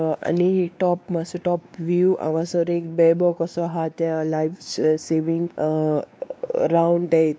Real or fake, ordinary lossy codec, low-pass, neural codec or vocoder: fake; none; none; codec, 16 kHz, 2 kbps, X-Codec, WavLM features, trained on Multilingual LibriSpeech